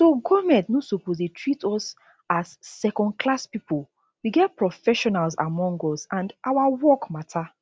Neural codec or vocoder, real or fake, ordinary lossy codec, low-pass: none; real; none; none